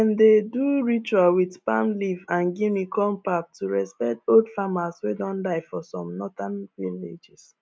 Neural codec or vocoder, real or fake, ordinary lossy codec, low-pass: none; real; none; none